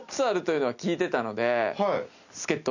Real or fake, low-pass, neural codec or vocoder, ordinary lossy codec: real; 7.2 kHz; none; none